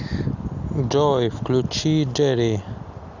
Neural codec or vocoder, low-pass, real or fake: none; 7.2 kHz; real